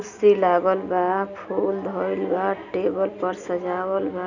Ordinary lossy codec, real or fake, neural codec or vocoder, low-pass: none; real; none; 7.2 kHz